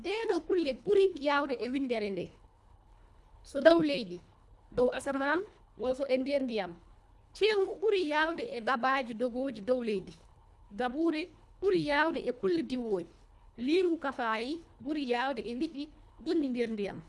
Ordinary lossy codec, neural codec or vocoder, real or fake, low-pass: none; codec, 24 kHz, 1.5 kbps, HILCodec; fake; none